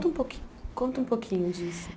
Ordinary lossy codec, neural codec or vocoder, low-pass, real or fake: none; none; none; real